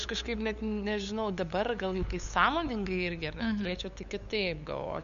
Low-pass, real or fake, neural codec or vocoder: 7.2 kHz; fake; codec, 16 kHz, 8 kbps, FunCodec, trained on LibriTTS, 25 frames a second